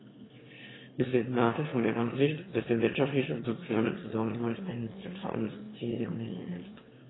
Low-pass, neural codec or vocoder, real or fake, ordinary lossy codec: 7.2 kHz; autoencoder, 22.05 kHz, a latent of 192 numbers a frame, VITS, trained on one speaker; fake; AAC, 16 kbps